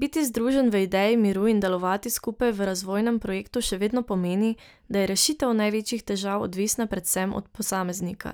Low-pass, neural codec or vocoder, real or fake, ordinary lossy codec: none; none; real; none